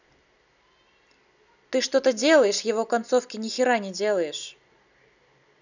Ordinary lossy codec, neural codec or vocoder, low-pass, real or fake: none; none; 7.2 kHz; real